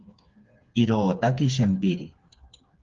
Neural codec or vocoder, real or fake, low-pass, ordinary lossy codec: codec, 16 kHz, 4 kbps, FreqCodec, smaller model; fake; 7.2 kHz; Opus, 32 kbps